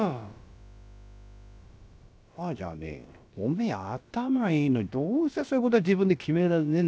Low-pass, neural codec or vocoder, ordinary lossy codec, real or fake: none; codec, 16 kHz, about 1 kbps, DyCAST, with the encoder's durations; none; fake